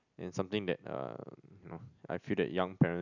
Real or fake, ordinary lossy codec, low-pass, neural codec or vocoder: real; none; 7.2 kHz; none